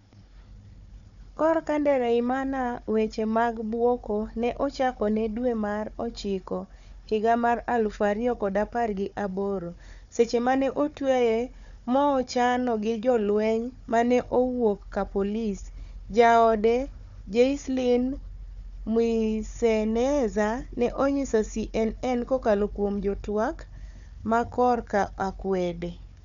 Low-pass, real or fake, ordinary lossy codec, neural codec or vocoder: 7.2 kHz; fake; none; codec, 16 kHz, 4 kbps, FunCodec, trained on Chinese and English, 50 frames a second